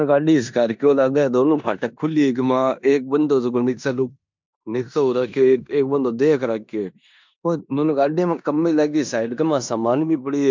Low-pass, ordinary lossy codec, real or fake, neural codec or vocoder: 7.2 kHz; MP3, 64 kbps; fake; codec, 16 kHz in and 24 kHz out, 0.9 kbps, LongCat-Audio-Codec, four codebook decoder